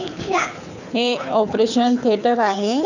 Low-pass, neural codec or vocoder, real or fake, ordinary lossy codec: 7.2 kHz; codec, 24 kHz, 6 kbps, HILCodec; fake; none